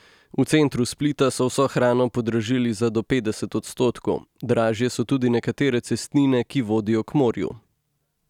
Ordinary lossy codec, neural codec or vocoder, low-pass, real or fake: none; none; 19.8 kHz; real